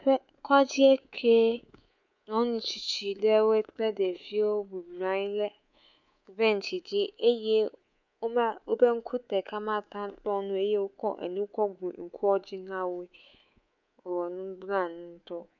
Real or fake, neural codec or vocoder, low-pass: fake; codec, 24 kHz, 3.1 kbps, DualCodec; 7.2 kHz